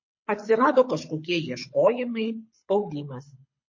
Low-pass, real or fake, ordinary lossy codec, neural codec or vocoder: 7.2 kHz; fake; MP3, 32 kbps; codec, 24 kHz, 6 kbps, HILCodec